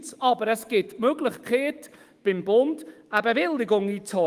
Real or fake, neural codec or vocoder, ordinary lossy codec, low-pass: fake; autoencoder, 48 kHz, 128 numbers a frame, DAC-VAE, trained on Japanese speech; Opus, 24 kbps; 14.4 kHz